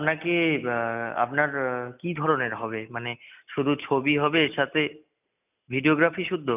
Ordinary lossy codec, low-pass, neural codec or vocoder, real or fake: none; 3.6 kHz; none; real